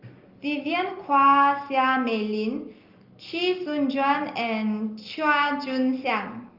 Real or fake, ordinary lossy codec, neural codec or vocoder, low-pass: real; Opus, 32 kbps; none; 5.4 kHz